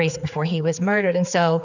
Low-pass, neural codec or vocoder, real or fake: 7.2 kHz; codec, 16 kHz, 4 kbps, X-Codec, HuBERT features, trained on general audio; fake